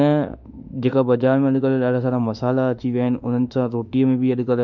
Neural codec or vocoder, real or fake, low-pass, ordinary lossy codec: autoencoder, 48 kHz, 32 numbers a frame, DAC-VAE, trained on Japanese speech; fake; 7.2 kHz; none